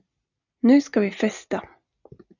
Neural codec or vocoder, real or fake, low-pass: none; real; 7.2 kHz